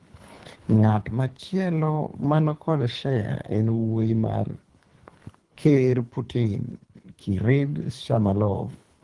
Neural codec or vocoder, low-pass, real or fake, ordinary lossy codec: codec, 24 kHz, 3 kbps, HILCodec; 10.8 kHz; fake; Opus, 24 kbps